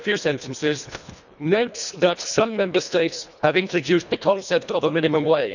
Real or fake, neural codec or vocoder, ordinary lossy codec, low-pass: fake; codec, 24 kHz, 1.5 kbps, HILCodec; none; 7.2 kHz